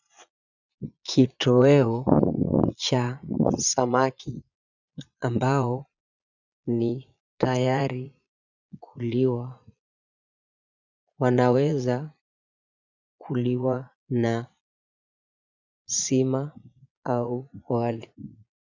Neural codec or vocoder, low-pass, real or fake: vocoder, 22.05 kHz, 80 mel bands, Vocos; 7.2 kHz; fake